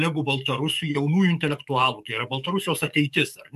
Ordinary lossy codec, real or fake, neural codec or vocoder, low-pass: MP3, 96 kbps; fake; codec, 44.1 kHz, 7.8 kbps, DAC; 14.4 kHz